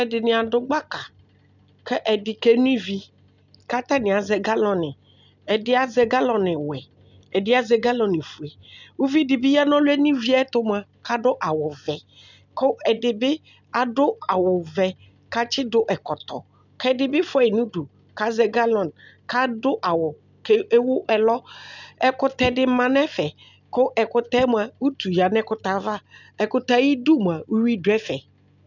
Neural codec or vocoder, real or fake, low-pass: none; real; 7.2 kHz